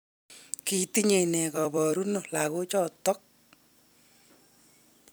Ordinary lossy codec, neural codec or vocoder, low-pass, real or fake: none; vocoder, 44.1 kHz, 128 mel bands every 256 samples, BigVGAN v2; none; fake